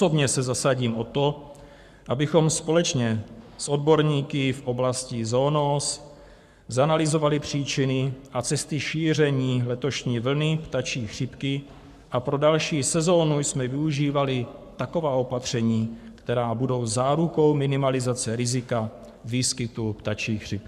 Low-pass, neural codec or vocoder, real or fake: 14.4 kHz; codec, 44.1 kHz, 7.8 kbps, Pupu-Codec; fake